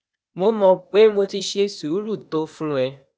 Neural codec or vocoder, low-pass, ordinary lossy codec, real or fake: codec, 16 kHz, 0.8 kbps, ZipCodec; none; none; fake